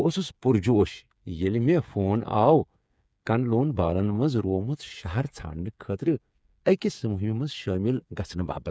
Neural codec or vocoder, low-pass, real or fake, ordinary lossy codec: codec, 16 kHz, 8 kbps, FreqCodec, smaller model; none; fake; none